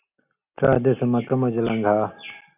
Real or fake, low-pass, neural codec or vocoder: real; 3.6 kHz; none